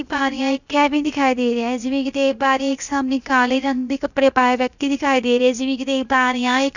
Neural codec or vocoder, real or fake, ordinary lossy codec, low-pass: codec, 16 kHz, 0.7 kbps, FocalCodec; fake; none; 7.2 kHz